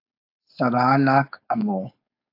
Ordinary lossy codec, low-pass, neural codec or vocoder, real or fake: AAC, 32 kbps; 5.4 kHz; codec, 16 kHz, 4.8 kbps, FACodec; fake